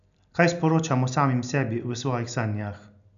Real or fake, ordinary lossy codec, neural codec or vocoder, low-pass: real; none; none; 7.2 kHz